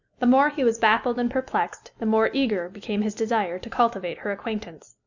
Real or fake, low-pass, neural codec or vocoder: real; 7.2 kHz; none